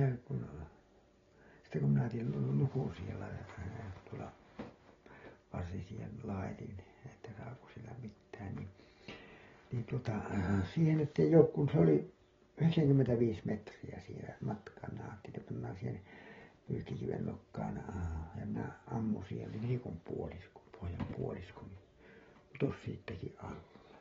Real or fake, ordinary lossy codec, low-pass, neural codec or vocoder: real; AAC, 24 kbps; 7.2 kHz; none